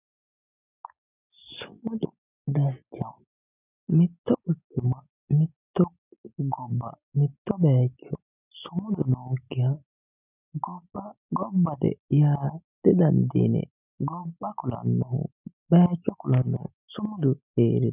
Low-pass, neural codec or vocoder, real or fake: 3.6 kHz; none; real